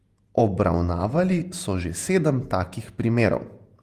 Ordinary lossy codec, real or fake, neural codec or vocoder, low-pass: Opus, 32 kbps; fake; vocoder, 48 kHz, 128 mel bands, Vocos; 14.4 kHz